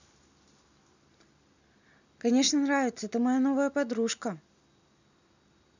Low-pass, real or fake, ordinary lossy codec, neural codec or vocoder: 7.2 kHz; real; none; none